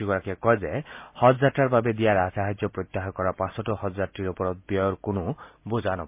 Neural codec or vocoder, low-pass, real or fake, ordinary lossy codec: none; 3.6 kHz; real; MP3, 32 kbps